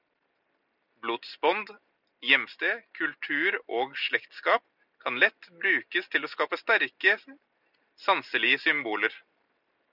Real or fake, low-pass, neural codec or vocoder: real; 5.4 kHz; none